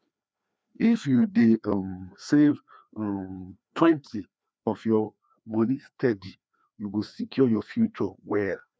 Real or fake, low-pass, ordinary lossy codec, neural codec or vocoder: fake; none; none; codec, 16 kHz, 2 kbps, FreqCodec, larger model